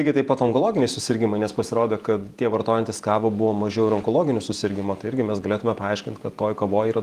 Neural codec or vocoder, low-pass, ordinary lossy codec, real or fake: vocoder, 48 kHz, 128 mel bands, Vocos; 14.4 kHz; Opus, 24 kbps; fake